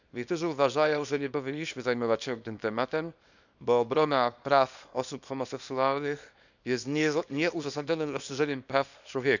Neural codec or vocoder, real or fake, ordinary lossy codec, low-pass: codec, 24 kHz, 0.9 kbps, WavTokenizer, small release; fake; none; 7.2 kHz